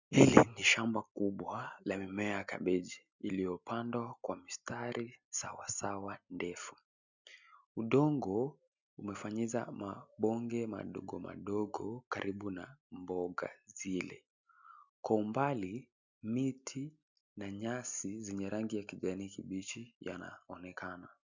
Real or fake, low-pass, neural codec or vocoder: real; 7.2 kHz; none